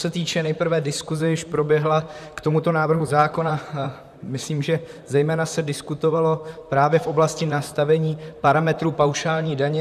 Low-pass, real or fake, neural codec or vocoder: 14.4 kHz; fake; vocoder, 44.1 kHz, 128 mel bands, Pupu-Vocoder